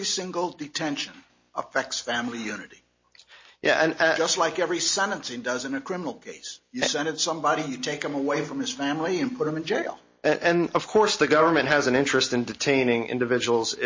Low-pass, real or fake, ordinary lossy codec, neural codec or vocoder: 7.2 kHz; real; MP3, 32 kbps; none